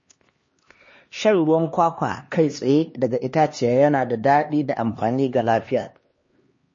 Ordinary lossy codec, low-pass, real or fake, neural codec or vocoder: MP3, 32 kbps; 7.2 kHz; fake; codec, 16 kHz, 2 kbps, X-Codec, HuBERT features, trained on LibriSpeech